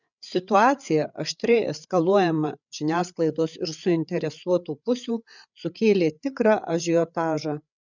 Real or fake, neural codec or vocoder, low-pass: fake; codec, 16 kHz, 8 kbps, FreqCodec, larger model; 7.2 kHz